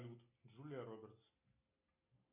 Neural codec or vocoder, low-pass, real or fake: none; 3.6 kHz; real